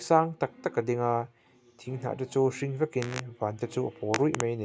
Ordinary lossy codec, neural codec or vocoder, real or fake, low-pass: none; none; real; none